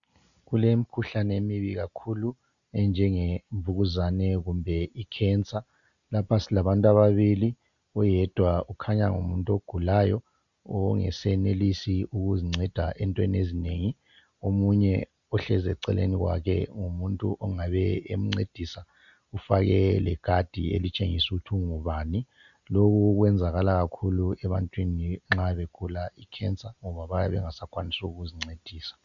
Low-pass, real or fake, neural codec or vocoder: 7.2 kHz; real; none